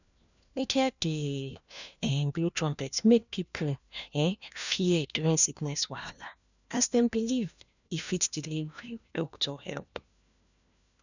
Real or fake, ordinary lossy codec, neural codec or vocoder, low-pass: fake; none; codec, 16 kHz, 1 kbps, FunCodec, trained on LibriTTS, 50 frames a second; 7.2 kHz